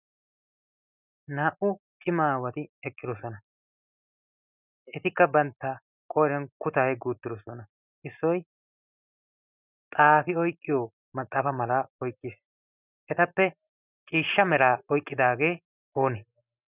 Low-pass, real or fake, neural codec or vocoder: 3.6 kHz; real; none